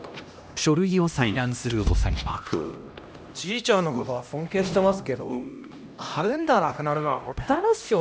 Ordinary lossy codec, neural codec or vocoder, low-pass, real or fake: none; codec, 16 kHz, 1 kbps, X-Codec, HuBERT features, trained on LibriSpeech; none; fake